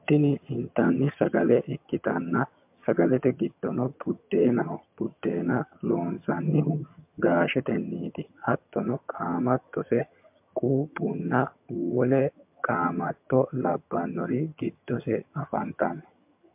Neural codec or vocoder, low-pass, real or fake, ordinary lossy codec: vocoder, 22.05 kHz, 80 mel bands, HiFi-GAN; 3.6 kHz; fake; MP3, 32 kbps